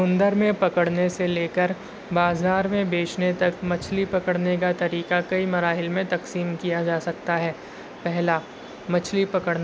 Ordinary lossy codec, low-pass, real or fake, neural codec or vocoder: none; none; real; none